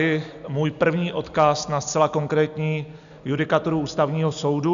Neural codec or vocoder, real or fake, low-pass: none; real; 7.2 kHz